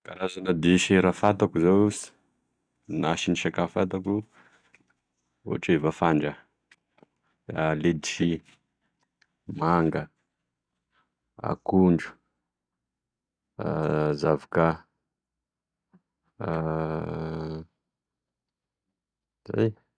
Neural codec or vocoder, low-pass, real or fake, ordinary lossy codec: none; 9.9 kHz; real; none